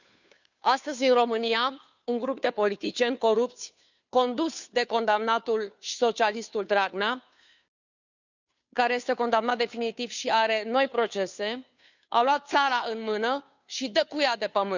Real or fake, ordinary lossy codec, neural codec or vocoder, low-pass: fake; none; codec, 16 kHz, 2 kbps, FunCodec, trained on Chinese and English, 25 frames a second; 7.2 kHz